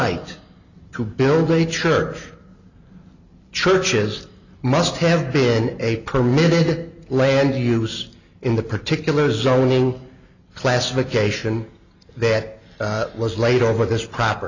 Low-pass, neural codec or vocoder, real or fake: 7.2 kHz; none; real